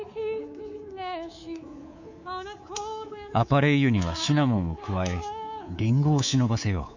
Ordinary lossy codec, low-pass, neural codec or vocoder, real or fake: none; 7.2 kHz; codec, 24 kHz, 3.1 kbps, DualCodec; fake